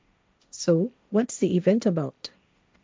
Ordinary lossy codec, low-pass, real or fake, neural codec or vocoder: none; none; fake; codec, 16 kHz, 1.1 kbps, Voila-Tokenizer